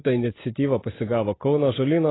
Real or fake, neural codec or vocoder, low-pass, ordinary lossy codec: real; none; 7.2 kHz; AAC, 16 kbps